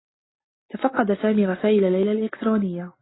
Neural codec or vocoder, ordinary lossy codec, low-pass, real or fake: none; AAC, 16 kbps; 7.2 kHz; real